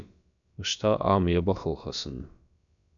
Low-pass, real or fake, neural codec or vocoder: 7.2 kHz; fake; codec, 16 kHz, about 1 kbps, DyCAST, with the encoder's durations